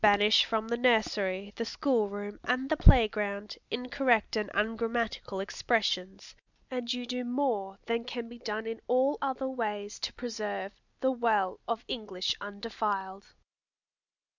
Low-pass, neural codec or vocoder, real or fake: 7.2 kHz; none; real